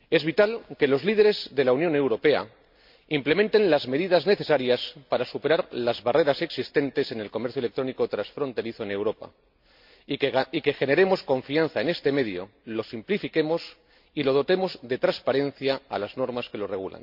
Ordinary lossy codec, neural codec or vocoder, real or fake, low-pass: MP3, 48 kbps; none; real; 5.4 kHz